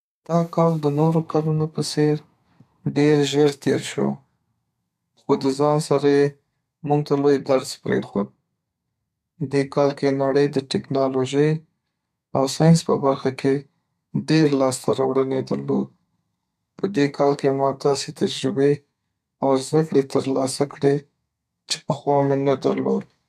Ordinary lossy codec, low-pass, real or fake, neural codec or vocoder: none; 14.4 kHz; fake; codec, 32 kHz, 1.9 kbps, SNAC